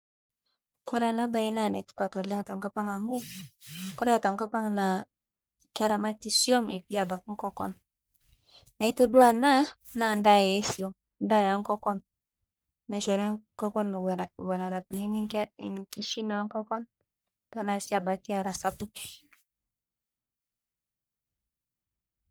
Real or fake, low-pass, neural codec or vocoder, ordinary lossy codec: fake; none; codec, 44.1 kHz, 1.7 kbps, Pupu-Codec; none